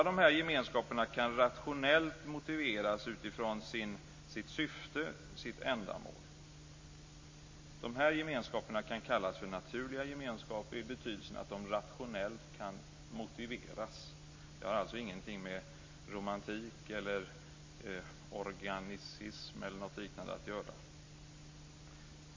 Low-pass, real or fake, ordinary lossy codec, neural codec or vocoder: 7.2 kHz; real; MP3, 32 kbps; none